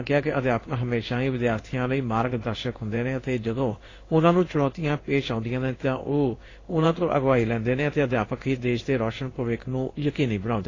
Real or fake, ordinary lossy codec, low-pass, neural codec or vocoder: fake; AAC, 32 kbps; 7.2 kHz; codec, 16 kHz in and 24 kHz out, 1 kbps, XY-Tokenizer